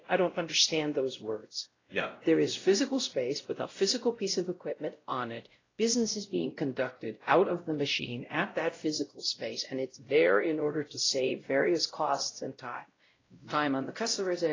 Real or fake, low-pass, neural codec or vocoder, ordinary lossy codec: fake; 7.2 kHz; codec, 16 kHz, 0.5 kbps, X-Codec, WavLM features, trained on Multilingual LibriSpeech; AAC, 32 kbps